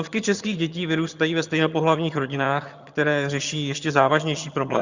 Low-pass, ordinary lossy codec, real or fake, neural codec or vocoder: 7.2 kHz; Opus, 64 kbps; fake; vocoder, 22.05 kHz, 80 mel bands, HiFi-GAN